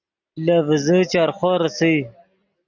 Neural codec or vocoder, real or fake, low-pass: none; real; 7.2 kHz